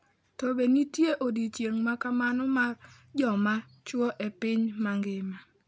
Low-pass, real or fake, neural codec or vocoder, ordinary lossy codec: none; real; none; none